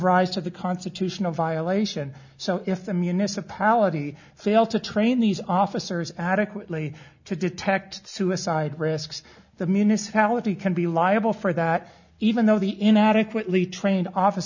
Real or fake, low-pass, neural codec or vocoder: real; 7.2 kHz; none